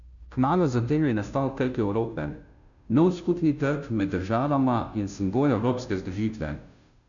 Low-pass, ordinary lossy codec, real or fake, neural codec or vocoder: 7.2 kHz; none; fake; codec, 16 kHz, 0.5 kbps, FunCodec, trained on Chinese and English, 25 frames a second